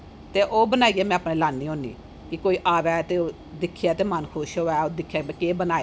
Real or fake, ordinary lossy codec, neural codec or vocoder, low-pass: real; none; none; none